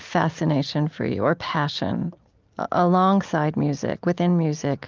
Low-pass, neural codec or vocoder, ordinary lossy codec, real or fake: 7.2 kHz; none; Opus, 24 kbps; real